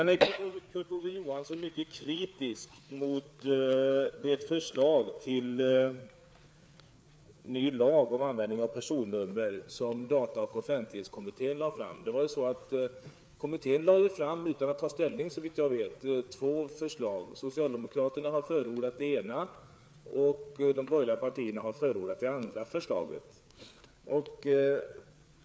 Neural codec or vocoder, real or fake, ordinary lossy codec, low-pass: codec, 16 kHz, 4 kbps, FreqCodec, larger model; fake; none; none